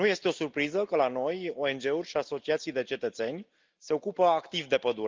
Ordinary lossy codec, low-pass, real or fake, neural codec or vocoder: Opus, 24 kbps; 7.2 kHz; real; none